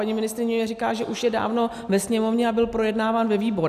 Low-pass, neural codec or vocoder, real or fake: 14.4 kHz; none; real